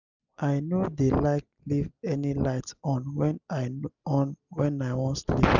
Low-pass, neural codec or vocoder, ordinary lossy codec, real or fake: 7.2 kHz; none; none; real